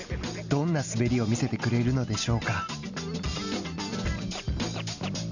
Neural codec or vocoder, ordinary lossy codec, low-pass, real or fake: none; none; 7.2 kHz; real